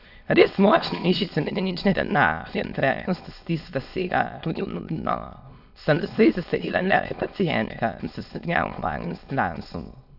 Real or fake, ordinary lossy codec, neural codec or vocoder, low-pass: fake; none; autoencoder, 22.05 kHz, a latent of 192 numbers a frame, VITS, trained on many speakers; 5.4 kHz